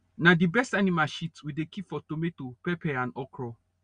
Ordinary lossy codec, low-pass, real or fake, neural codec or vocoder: Opus, 64 kbps; 9.9 kHz; real; none